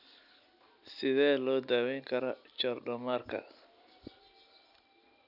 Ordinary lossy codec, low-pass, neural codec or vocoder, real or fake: none; 5.4 kHz; none; real